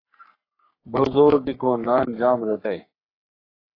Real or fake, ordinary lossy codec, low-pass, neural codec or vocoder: fake; AAC, 24 kbps; 5.4 kHz; codec, 44.1 kHz, 3.4 kbps, Pupu-Codec